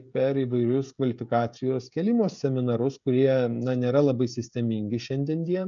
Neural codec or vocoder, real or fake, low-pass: codec, 16 kHz, 16 kbps, FreqCodec, smaller model; fake; 7.2 kHz